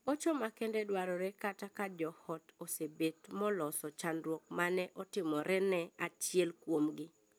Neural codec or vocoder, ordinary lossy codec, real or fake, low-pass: none; none; real; none